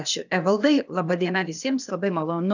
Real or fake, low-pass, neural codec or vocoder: fake; 7.2 kHz; codec, 16 kHz, 0.8 kbps, ZipCodec